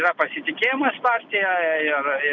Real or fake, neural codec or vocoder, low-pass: real; none; 7.2 kHz